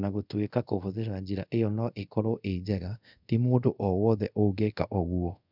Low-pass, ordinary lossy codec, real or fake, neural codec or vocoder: 5.4 kHz; none; fake; codec, 24 kHz, 0.5 kbps, DualCodec